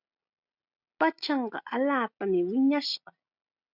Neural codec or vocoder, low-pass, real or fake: none; 5.4 kHz; real